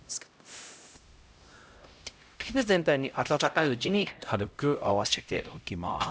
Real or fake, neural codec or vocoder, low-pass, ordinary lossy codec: fake; codec, 16 kHz, 0.5 kbps, X-Codec, HuBERT features, trained on LibriSpeech; none; none